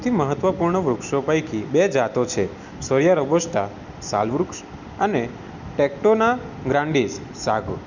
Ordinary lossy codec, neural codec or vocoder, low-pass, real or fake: none; none; 7.2 kHz; real